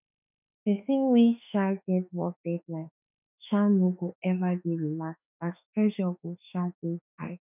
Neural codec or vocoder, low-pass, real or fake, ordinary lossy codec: autoencoder, 48 kHz, 32 numbers a frame, DAC-VAE, trained on Japanese speech; 3.6 kHz; fake; none